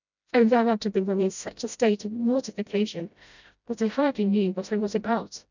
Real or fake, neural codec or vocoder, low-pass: fake; codec, 16 kHz, 0.5 kbps, FreqCodec, smaller model; 7.2 kHz